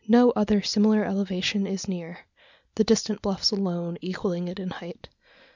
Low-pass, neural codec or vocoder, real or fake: 7.2 kHz; none; real